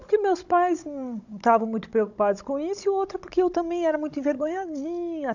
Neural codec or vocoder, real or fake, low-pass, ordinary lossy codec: codec, 16 kHz, 16 kbps, FunCodec, trained on Chinese and English, 50 frames a second; fake; 7.2 kHz; none